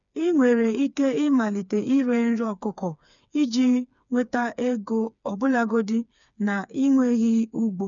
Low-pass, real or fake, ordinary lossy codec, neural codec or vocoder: 7.2 kHz; fake; none; codec, 16 kHz, 4 kbps, FreqCodec, smaller model